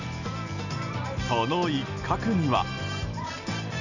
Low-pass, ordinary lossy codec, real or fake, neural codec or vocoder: 7.2 kHz; none; real; none